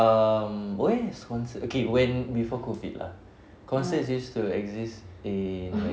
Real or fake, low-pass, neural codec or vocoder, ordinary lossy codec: real; none; none; none